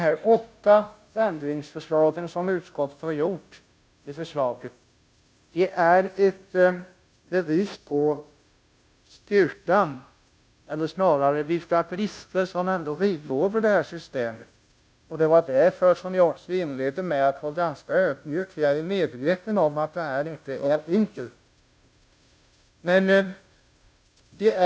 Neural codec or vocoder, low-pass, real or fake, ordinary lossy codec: codec, 16 kHz, 0.5 kbps, FunCodec, trained on Chinese and English, 25 frames a second; none; fake; none